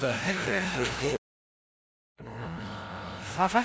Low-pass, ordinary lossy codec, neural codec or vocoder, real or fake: none; none; codec, 16 kHz, 0.5 kbps, FunCodec, trained on LibriTTS, 25 frames a second; fake